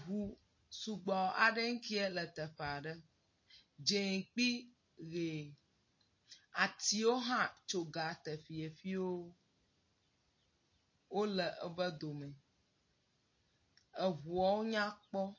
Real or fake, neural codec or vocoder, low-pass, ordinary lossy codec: real; none; 7.2 kHz; MP3, 32 kbps